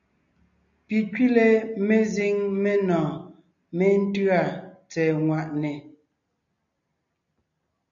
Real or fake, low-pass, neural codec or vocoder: real; 7.2 kHz; none